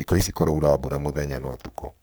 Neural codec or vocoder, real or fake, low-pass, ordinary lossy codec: codec, 44.1 kHz, 3.4 kbps, Pupu-Codec; fake; none; none